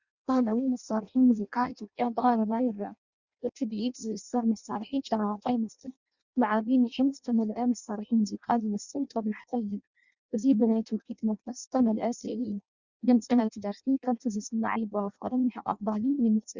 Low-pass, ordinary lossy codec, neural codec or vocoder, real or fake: 7.2 kHz; Opus, 64 kbps; codec, 16 kHz in and 24 kHz out, 0.6 kbps, FireRedTTS-2 codec; fake